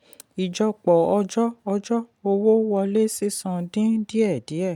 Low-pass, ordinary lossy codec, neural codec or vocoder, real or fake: 19.8 kHz; none; none; real